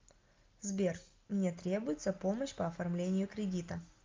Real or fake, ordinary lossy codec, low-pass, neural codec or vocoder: real; Opus, 32 kbps; 7.2 kHz; none